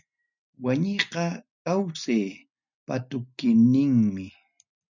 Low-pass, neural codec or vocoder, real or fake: 7.2 kHz; none; real